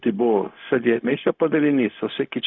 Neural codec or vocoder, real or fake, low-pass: codec, 16 kHz, 0.4 kbps, LongCat-Audio-Codec; fake; 7.2 kHz